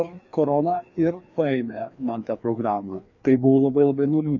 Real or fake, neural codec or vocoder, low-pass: fake; codec, 16 kHz, 2 kbps, FreqCodec, larger model; 7.2 kHz